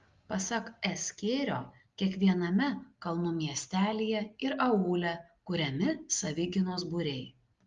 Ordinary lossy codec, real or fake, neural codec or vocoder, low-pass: Opus, 32 kbps; real; none; 7.2 kHz